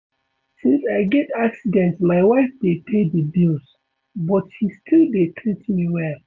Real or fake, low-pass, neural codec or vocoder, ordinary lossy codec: real; 7.2 kHz; none; MP3, 64 kbps